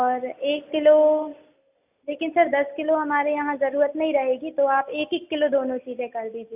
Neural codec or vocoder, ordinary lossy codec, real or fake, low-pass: none; AAC, 32 kbps; real; 3.6 kHz